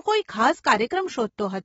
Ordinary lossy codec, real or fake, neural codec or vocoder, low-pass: AAC, 24 kbps; real; none; 19.8 kHz